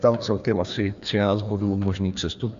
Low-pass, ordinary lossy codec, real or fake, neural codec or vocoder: 7.2 kHz; Opus, 64 kbps; fake; codec, 16 kHz, 2 kbps, FreqCodec, larger model